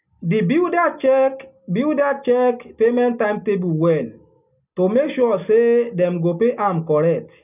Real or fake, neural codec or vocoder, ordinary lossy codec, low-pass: real; none; none; 3.6 kHz